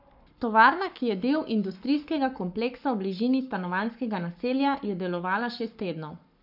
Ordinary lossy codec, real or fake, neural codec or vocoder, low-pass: none; fake; codec, 44.1 kHz, 7.8 kbps, Pupu-Codec; 5.4 kHz